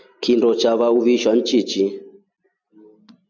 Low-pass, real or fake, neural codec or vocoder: 7.2 kHz; real; none